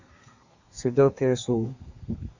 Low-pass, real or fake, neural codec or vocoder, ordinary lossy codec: 7.2 kHz; fake; codec, 32 kHz, 1.9 kbps, SNAC; Opus, 64 kbps